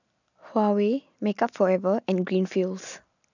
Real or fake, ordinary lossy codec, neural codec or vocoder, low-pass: real; none; none; 7.2 kHz